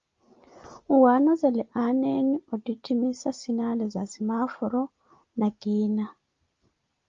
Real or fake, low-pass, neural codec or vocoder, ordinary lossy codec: real; 7.2 kHz; none; Opus, 32 kbps